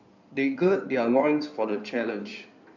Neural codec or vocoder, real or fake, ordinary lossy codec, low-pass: codec, 16 kHz in and 24 kHz out, 2.2 kbps, FireRedTTS-2 codec; fake; none; 7.2 kHz